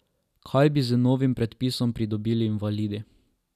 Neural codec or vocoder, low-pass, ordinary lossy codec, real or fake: none; 14.4 kHz; none; real